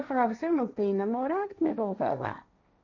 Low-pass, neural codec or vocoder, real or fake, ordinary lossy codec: 7.2 kHz; codec, 16 kHz, 1.1 kbps, Voila-Tokenizer; fake; none